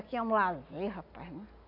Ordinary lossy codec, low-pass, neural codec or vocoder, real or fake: none; 5.4 kHz; none; real